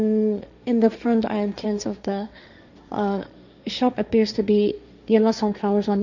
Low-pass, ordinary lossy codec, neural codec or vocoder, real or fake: 7.2 kHz; none; codec, 16 kHz, 1.1 kbps, Voila-Tokenizer; fake